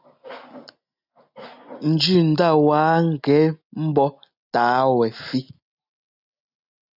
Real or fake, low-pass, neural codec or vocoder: real; 5.4 kHz; none